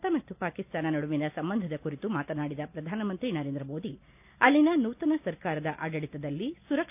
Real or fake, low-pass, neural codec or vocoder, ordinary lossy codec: real; 3.6 kHz; none; none